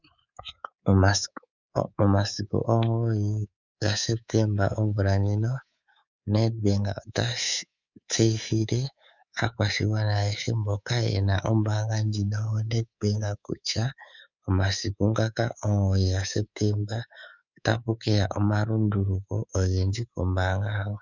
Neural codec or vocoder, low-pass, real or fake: codec, 24 kHz, 3.1 kbps, DualCodec; 7.2 kHz; fake